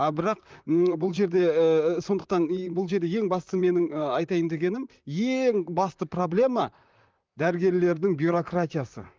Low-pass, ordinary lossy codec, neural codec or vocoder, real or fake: 7.2 kHz; Opus, 24 kbps; vocoder, 44.1 kHz, 128 mel bands, Pupu-Vocoder; fake